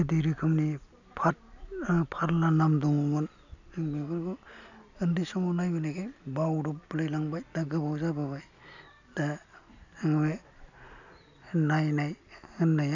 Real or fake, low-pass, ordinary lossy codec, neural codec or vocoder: real; 7.2 kHz; none; none